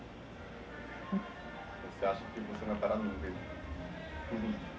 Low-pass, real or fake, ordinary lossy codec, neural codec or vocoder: none; real; none; none